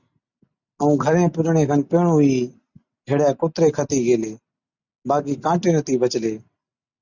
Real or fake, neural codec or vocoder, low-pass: real; none; 7.2 kHz